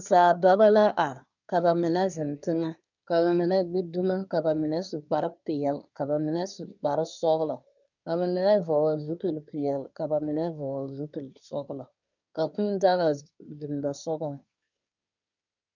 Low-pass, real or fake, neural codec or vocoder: 7.2 kHz; fake; codec, 24 kHz, 1 kbps, SNAC